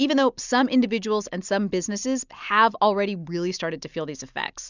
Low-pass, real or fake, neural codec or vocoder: 7.2 kHz; real; none